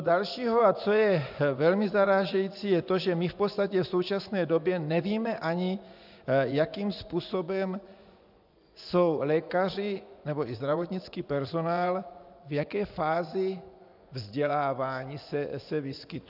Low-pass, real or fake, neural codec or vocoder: 5.4 kHz; fake; vocoder, 24 kHz, 100 mel bands, Vocos